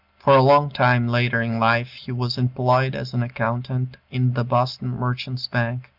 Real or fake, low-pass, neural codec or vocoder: real; 5.4 kHz; none